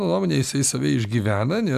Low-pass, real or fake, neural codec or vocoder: 14.4 kHz; real; none